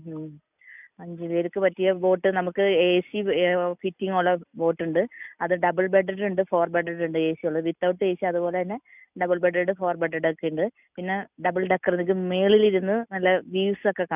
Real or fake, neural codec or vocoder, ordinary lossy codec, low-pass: real; none; none; 3.6 kHz